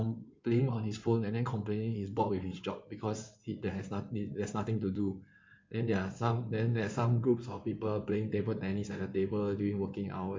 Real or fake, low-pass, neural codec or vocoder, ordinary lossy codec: fake; 7.2 kHz; codec, 16 kHz in and 24 kHz out, 2.2 kbps, FireRedTTS-2 codec; MP3, 48 kbps